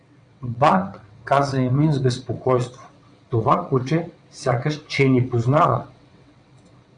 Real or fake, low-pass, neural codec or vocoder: fake; 9.9 kHz; vocoder, 22.05 kHz, 80 mel bands, WaveNeXt